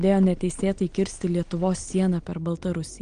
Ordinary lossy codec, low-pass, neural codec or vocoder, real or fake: Opus, 24 kbps; 9.9 kHz; none; real